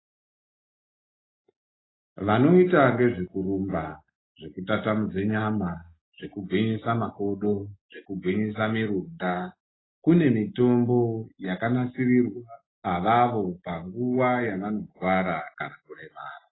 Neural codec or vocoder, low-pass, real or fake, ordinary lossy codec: none; 7.2 kHz; real; AAC, 16 kbps